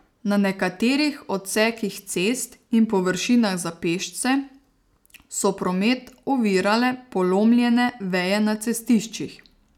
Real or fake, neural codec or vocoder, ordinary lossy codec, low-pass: real; none; none; 19.8 kHz